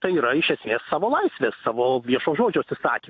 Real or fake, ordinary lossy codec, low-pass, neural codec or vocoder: real; AAC, 48 kbps; 7.2 kHz; none